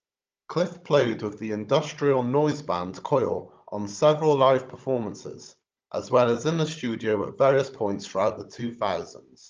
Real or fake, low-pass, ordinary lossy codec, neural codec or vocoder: fake; 7.2 kHz; Opus, 24 kbps; codec, 16 kHz, 16 kbps, FunCodec, trained on Chinese and English, 50 frames a second